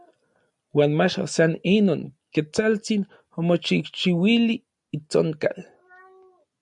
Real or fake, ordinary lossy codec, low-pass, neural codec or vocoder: real; MP3, 96 kbps; 10.8 kHz; none